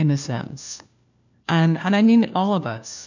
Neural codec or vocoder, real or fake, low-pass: codec, 16 kHz, 1 kbps, FunCodec, trained on LibriTTS, 50 frames a second; fake; 7.2 kHz